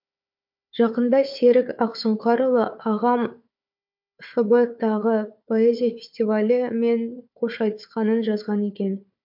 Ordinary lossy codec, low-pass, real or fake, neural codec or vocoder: MP3, 48 kbps; 5.4 kHz; fake; codec, 16 kHz, 4 kbps, FunCodec, trained on Chinese and English, 50 frames a second